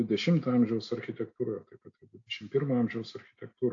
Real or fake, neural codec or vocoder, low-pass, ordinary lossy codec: real; none; 7.2 kHz; AAC, 48 kbps